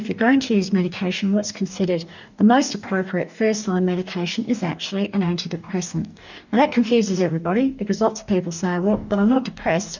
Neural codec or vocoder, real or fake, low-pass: codec, 44.1 kHz, 2.6 kbps, DAC; fake; 7.2 kHz